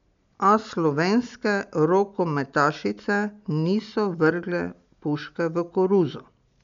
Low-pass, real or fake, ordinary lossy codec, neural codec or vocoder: 7.2 kHz; real; MP3, 64 kbps; none